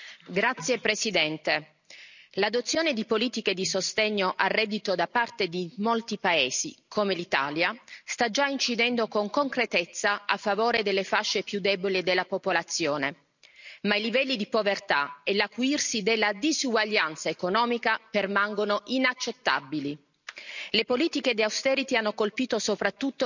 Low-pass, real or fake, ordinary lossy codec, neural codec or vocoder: 7.2 kHz; real; none; none